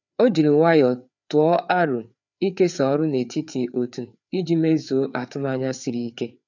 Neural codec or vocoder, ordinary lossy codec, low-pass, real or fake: codec, 16 kHz, 4 kbps, FreqCodec, larger model; none; 7.2 kHz; fake